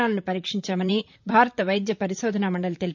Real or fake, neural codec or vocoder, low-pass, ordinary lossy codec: fake; vocoder, 44.1 kHz, 128 mel bands, Pupu-Vocoder; 7.2 kHz; MP3, 64 kbps